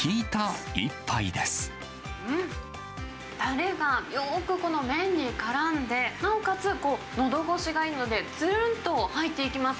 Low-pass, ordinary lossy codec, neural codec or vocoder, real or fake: none; none; none; real